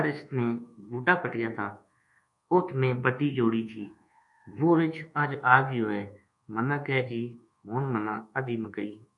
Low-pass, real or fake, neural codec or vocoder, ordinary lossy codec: 10.8 kHz; fake; codec, 24 kHz, 1.2 kbps, DualCodec; MP3, 64 kbps